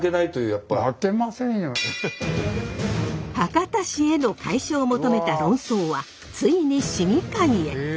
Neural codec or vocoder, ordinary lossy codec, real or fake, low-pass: none; none; real; none